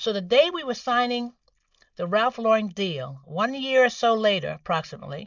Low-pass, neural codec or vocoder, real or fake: 7.2 kHz; none; real